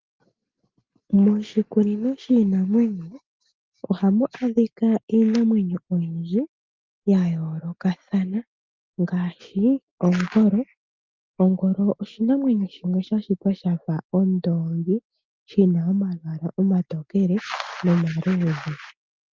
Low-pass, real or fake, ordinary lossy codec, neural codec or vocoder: 7.2 kHz; real; Opus, 32 kbps; none